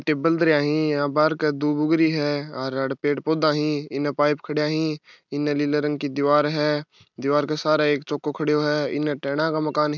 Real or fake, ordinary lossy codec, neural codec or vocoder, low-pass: real; none; none; 7.2 kHz